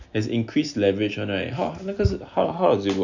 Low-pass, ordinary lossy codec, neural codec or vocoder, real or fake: 7.2 kHz; none; none; real